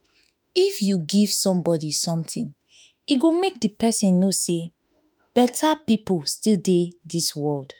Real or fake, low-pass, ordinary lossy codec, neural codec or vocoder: fake; none; none; autoencoder, 48 kHz, 32 numbers a frame, DAC-VAE, trained on Japanese speech